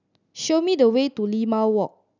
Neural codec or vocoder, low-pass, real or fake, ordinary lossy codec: none; 7.2 kHz; real; none